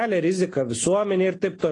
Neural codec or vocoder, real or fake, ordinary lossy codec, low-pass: none; real; AAC, 32 kbps; 9.9 kHz